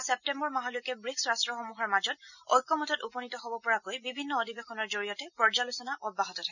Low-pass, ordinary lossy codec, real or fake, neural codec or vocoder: 7.2 kHz; none; real; none